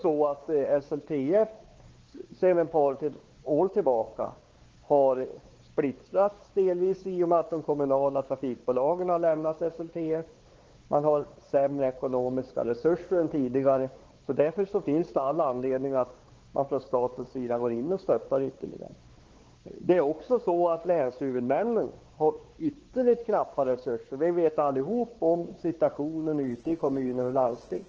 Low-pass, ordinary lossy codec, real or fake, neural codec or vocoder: 7.2 kHz; Opus, 16 kbps; fake; codec, 16 kHz, 4 kbps, X-Codec, WavLM features, trained on Multilingual LibriSpeech